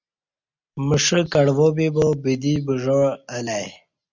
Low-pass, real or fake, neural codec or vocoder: 7.2 kHz; real; none